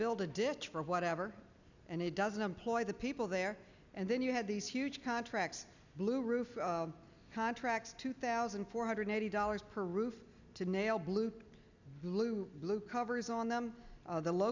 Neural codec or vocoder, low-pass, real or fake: none; 7.2 kHz; real